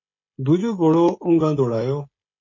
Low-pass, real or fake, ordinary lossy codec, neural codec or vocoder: 7.2 kHz; fake; MP3, 32 kbps; codec, 16 kHz, 16 kbps, FreqCodec, smaller model